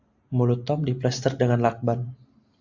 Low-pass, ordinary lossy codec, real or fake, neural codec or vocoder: 7.2 kHz; AAC, 48 kbps; real; none